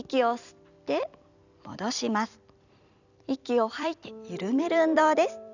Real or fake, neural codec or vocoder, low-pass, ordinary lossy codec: fake; vocoder, 44.1 kHz, 128 mel bands every 256 samples, BigVGAN v2; 7.2 kHz; none